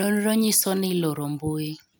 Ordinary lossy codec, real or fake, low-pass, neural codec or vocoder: none; real; none; none